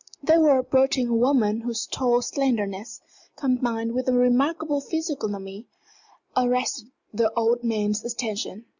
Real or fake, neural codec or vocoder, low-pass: real; none; 7.2 kHz